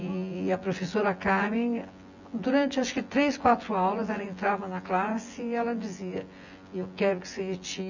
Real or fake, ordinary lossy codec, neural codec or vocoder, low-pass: fake; none; vocoder, 24 kHz, 100 mel bands, Vocos; 7.2 kHz